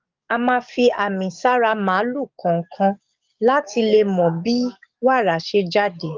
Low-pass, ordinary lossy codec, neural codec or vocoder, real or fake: 7.2 kHz; Opus, 32 kbps; codec, 44.1 kHz, 7.8 kbps, DAC; fake